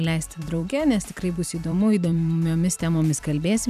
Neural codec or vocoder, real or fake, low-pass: vocoder, 44.1 kHz, 128 mel bands every 256 samples, BigVGAN v2; fake; 14.4 kHz